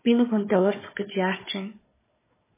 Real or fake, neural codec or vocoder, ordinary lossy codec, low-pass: fake; codec, 16 kHz, 4 kbps, FunCodec, trained on Chinese and English, 50 frames a second; MP3, 16 kbps; 3.6 kHz